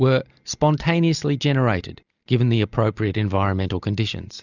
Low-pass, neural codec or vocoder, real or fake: 7.2 kHz; none; real